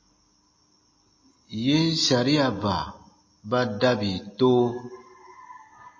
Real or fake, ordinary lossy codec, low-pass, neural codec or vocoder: real; MP3, 32 kbps; 7.2 kHz; none